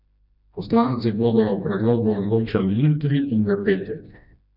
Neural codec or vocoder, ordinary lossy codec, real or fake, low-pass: codec, 16 kHz, 1 kbps, FreqCodec, smaller model; none; fake; 5.4 kHz